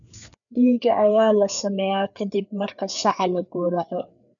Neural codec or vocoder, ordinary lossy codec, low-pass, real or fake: codec, 16 kHz, 4 kbps, FreqCodec, larger model; none; 7.2 kHz; fake